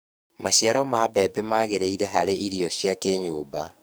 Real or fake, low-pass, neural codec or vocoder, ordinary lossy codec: fake; none; codec, 44.1 kHz, 2.6 kbps, SNAC; none